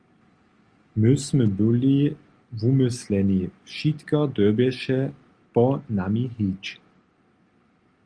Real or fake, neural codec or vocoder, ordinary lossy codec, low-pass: real; none; Opus, 32 kbps; 9.9 kHz